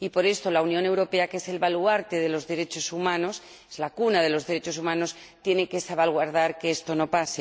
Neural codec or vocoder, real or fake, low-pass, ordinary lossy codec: none; real; none; none